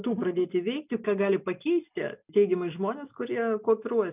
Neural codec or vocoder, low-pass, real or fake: none; 3.6 kHz; real